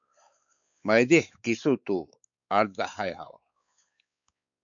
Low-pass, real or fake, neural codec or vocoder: 7.2 kHz; fake; codec, 16 kHz, 4 kbps, X-Codec, WavLM features, trained on Multilingual LibriSpeech